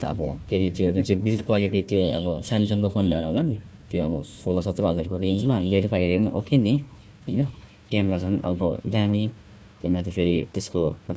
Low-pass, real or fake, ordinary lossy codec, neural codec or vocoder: none; fake; none; codec, 16 kHz, 1 kbps, FunCodec, trained on Chinese and English, 50 frames a second